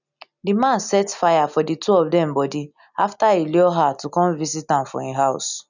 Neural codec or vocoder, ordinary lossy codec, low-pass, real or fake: none; none; 7.2 kHz; real